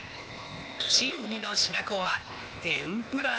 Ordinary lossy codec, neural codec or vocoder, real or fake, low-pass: none; codec, 16 kHz, 0.8 kbps, ZipCodec; fake; none